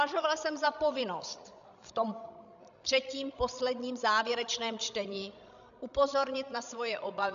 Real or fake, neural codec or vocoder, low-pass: fake; codec, 16 kHz, 16 kbps, FreqCodec, larger model; 7.2 kHz